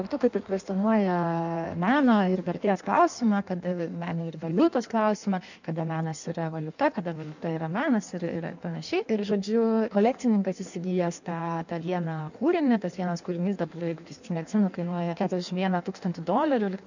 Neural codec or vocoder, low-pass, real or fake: codec, 16 kHz in and 24 kHz out, 1.1 kbps, FireRedTTS-2 codec; 7.2 kHz; fake